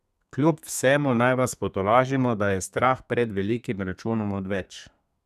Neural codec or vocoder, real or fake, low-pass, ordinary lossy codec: codec, 32 kHz, 1.9 kbps, SNAC; fake; 14.4 kHz; none